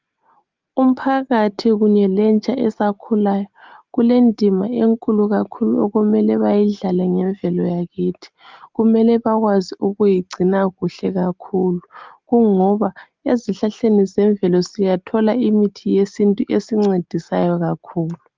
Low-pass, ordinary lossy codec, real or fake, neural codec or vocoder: 7.2 kHz; Opus, 32 kbps; real; none